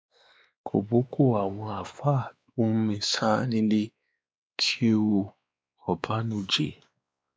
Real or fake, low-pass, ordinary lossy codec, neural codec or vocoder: fake; none; none; codec, 16 kHz, 2 kbps, X-Codec, WavLM features, trained on Multilingual LibriSpeech